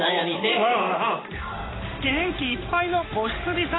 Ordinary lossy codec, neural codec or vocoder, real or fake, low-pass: AAC, 16 kbps; codec, 16 kHz in and 24 kHz out, 1 kbps, XY-Tokenizer; fake; 7.2 kHz